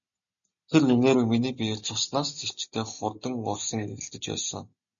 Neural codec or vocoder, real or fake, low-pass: none; real; 7.2 kHz